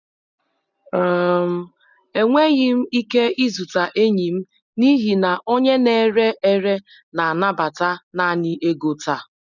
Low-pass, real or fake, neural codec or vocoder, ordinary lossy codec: 7.2 kHz; real; none; none